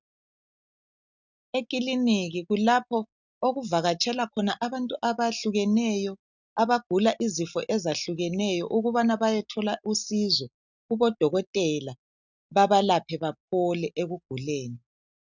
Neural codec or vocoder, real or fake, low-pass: none; real; 7.2 kHz